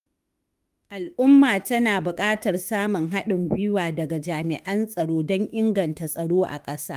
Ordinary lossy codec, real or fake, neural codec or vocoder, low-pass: Opus, 32 kbps; fake; autoencoder, 48 kHz, 32 numbers a frame, DAC-VAE, trained on Japanese speech; 14.4 kHz